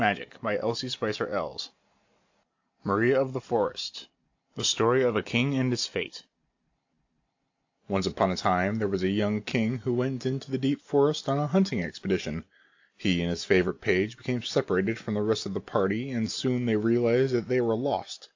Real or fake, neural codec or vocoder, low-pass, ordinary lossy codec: real; none; 7.2 kHz; AAC, 48 kbps